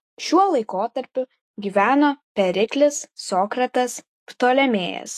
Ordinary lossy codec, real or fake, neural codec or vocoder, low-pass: AAC, 48 kbps; fake; autoencoder, 48 kHz, 128 numbers a frame, DAC-VAE, trained on Japanese speech; 14.4 kHz